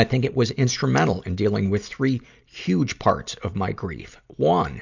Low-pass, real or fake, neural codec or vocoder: 7.2 kHz; real; none